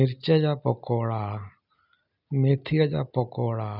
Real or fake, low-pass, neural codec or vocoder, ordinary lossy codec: real; 5.4 kHz; none; none